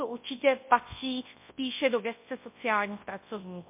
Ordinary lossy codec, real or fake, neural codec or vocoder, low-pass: MP3, 24 kbps; fake; codec, 24 kHz, 0.9 kbps, WavTokenizer, large speech release; 3.6 kHz